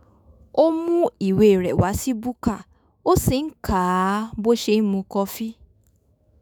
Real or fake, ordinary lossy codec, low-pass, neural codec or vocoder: fake; none; none; autoencoder, 48 kHz, 128 numbers a frame, DAC-VAE, trained on Japanese speech